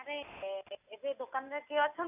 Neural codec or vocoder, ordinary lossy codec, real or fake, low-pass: none; none; real; 3.6 kHz